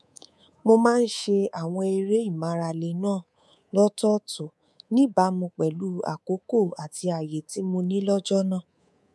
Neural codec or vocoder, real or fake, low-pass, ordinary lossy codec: codec, 24 kHz, 3.1 kbps, DualCodec; fake; none; none